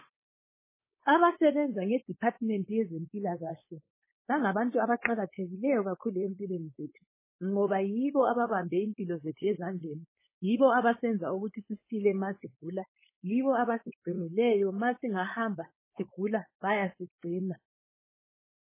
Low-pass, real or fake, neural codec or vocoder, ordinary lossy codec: 3.6 kHz; fake; codec, 16 kHz, 4.8 kbps, FACodec; MP3, 16 kbps